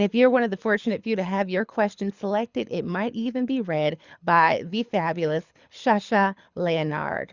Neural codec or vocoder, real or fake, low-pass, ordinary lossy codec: codec, 24 kHz, 6 kbps, HILCodec; fake; 7.2 kHz; Opus, 64 kbps